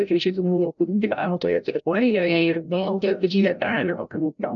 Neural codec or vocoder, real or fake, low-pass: codec, 16 kHz, 0.5 kbps, FreqCodec, larger model; fake; 7.2 kHz